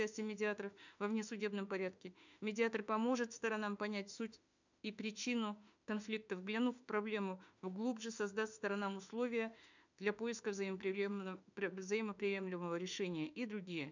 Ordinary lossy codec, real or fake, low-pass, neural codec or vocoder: none; fake; 7.2 kHz; autoencoder, 48 kHz, 32 numbers a frame, DAC-VAE, trained on Japanese speech